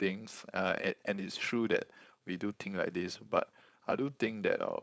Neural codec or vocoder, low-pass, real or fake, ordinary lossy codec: codec, 16 kHz, 4.8 kbps, FACodec; none; fake; none